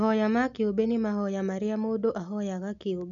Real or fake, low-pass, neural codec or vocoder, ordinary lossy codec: real; 7.2 kHz; none; none